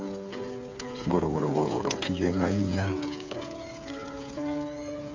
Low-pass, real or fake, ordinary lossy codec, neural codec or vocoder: 7.2 kHz; fake; MP3, 64 kbps; codec, 16 kHz, 8 kbps, FreqCodec, smaller model